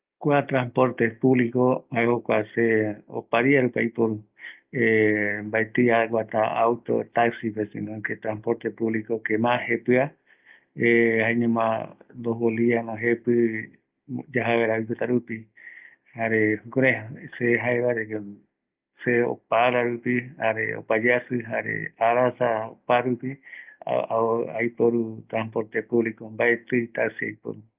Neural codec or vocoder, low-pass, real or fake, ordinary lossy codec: none; 3.6 kHz; real; Opus, 32 kbps